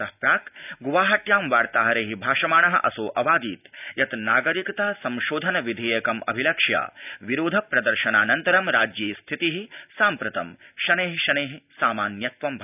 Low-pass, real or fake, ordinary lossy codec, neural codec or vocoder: 3.6 kHz; real; none; none